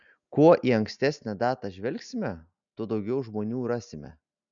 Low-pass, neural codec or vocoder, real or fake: 7.2 kHz; none; real